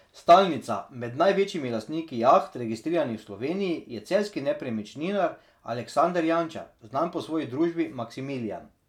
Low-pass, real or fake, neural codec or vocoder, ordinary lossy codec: 19.8 kHz; real; none; none